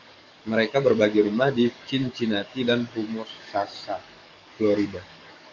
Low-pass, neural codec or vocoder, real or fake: 7.2 kHz; codec, 44.1 kHz, 7.8 kbps, DAC; fake